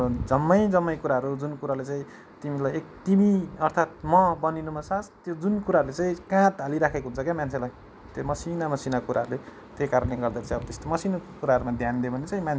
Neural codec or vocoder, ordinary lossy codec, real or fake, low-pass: none; none; real; none